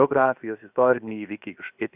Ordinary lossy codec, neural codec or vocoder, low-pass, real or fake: Opus, 64 kbps; codec, 16 kHz, 0.7 kbps, FocalCodec; 3.6 kHz; fake